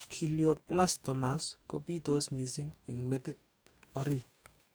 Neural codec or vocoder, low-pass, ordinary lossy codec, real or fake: codec, 44.1 kHz, 2.6 kbps, DAC; none; none; fake